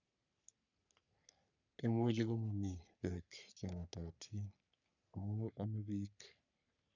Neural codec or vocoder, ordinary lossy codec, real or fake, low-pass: codec, 44.1 kHz, 3.4 kbps, Pupu-Codec; none; fake; 7.2 kHz